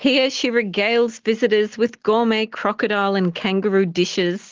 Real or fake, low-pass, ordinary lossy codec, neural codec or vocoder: real; 7.2 kHz; Opus, 16 kbps; none